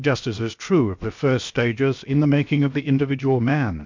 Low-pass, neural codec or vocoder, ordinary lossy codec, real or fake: 7.2 kHz; codec, 16 kHz, 0.7 kbps, FocalCodec; MP3, 64 kbps; fake